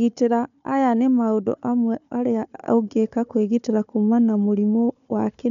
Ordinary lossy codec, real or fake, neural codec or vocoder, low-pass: none; fake; codec, 16 kHz, 4 kbps, FunCodec, trained on Chinese and English, 50 frames a second; 7.2 kHz